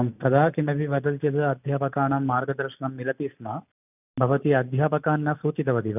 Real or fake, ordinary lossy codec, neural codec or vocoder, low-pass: real; none; none; 3.6 kHz